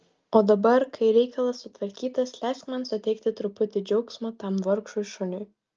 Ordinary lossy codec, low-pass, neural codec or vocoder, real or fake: Opus, 24 kbps; 7.2 kHz; none; real